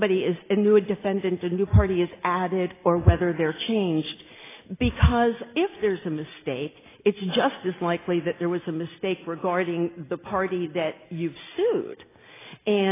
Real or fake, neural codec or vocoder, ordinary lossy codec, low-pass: real; none; AAC, 16 kbps; 3.6 kHz